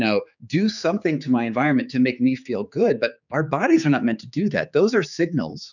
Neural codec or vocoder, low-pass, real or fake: codec, 16 kHz, 4 kbps, X-Codec, HuBERT features, trained on general audio; 7.2 kHz; fake